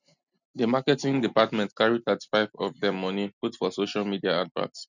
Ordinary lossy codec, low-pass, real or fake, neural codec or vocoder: none; 7.2 kHz; real; none